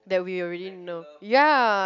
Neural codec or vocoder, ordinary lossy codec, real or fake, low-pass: none; none; real; 7.2 kHz